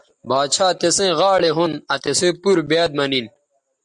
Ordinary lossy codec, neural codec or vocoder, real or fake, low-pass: Opus, 64 kbps; none; real; 10.8 kHz